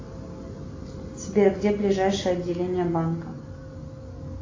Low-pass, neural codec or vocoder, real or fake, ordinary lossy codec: 7.2 kHz; none; real; AAC, 32 kbps